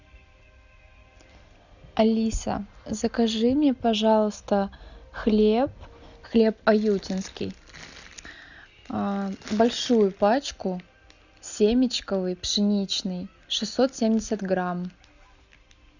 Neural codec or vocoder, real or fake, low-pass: none; real; 7.2 kHz